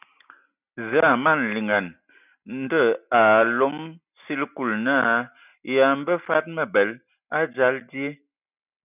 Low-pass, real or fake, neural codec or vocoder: 3.6 kHz; real; none